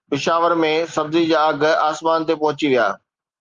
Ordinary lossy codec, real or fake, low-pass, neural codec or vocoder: Opus, 32 kbps; real; 7.2 kHz; none